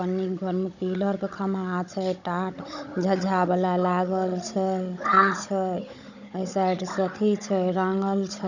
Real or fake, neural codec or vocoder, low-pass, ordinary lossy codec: fake; codec, 16 kHz, 16 kbps, FunCodec, trained on Chinese and English, 50 frames a second; 7.2 kHz; none